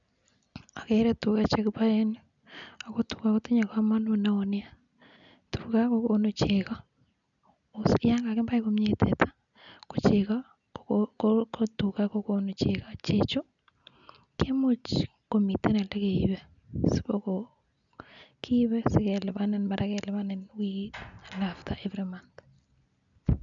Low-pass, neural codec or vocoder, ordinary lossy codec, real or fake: 7.2 kHz; none; none; real